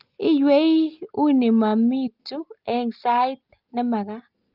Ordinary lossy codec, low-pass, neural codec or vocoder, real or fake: Opus, 32 kbps; 5.4 kHz; none; real